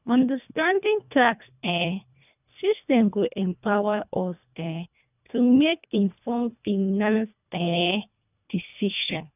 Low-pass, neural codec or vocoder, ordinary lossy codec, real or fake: 3.6 kHz; codec, 24 kHz, 1.5 kbps, HILCodec; none; fake